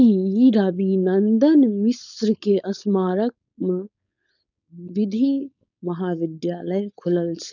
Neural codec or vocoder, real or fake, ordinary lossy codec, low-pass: codec, 16 kHz, 4.8 kbps, FACodec; fake; none; 7.2 kHz